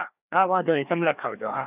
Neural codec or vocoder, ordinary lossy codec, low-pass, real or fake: codec, 16 kHz, 2 kbps, FreqCodec, larger model; none; 3.6 kHz; fake